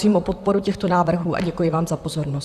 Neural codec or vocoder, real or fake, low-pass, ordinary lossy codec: vocoder, 44.1 kHz, 128 mel bands, Pupu-Vocoder; fake; 14.4 kHz; Opus, 64 kbps